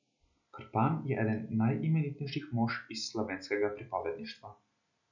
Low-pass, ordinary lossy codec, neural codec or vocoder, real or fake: 7.2 kHz; none; none; real